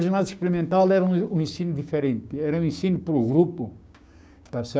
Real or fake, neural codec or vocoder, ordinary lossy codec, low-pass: fake; codec, 16 kHz, 6 kbps, DAC; none; none